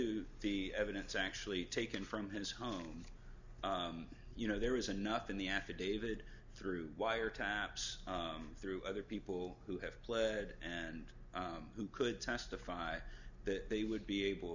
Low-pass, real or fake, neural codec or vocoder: 7.2 kHz; real; none